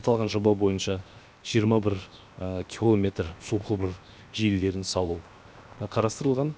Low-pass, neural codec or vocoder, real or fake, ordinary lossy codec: none; codec, 16 kHz, 0.7 kbps, FocalCodec; fake; none